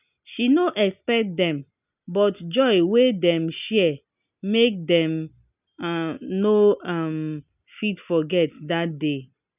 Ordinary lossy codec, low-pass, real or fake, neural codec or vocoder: none; 3.6 kHz; real; none